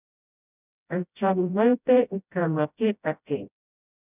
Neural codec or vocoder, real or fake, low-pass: codec, 16 kHz, 0.5 kbps, FreqCodec, smaller model; fake; 3.6 kHz